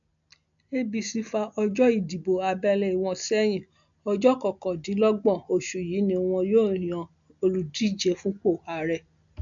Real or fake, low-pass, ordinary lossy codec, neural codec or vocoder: real; 7.2 kHz; none; none